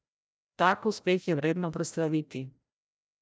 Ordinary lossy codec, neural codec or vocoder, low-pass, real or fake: none; codec, 16 kHz, 0.5 kbps, FreqCodec, larger model; none; fake